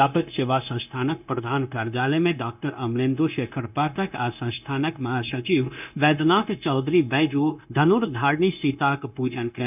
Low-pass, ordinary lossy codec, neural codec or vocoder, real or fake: 3.6 kHz; none; codec, 16 kHz, 0.9 kbps, LongCat-Audio-Codec; fake